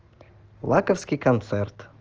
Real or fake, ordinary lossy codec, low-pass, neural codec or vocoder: real; Opus, 16 kbps; 7.2 kHz; none